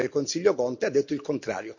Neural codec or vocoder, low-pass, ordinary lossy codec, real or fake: none; 7.2 kHz; none; real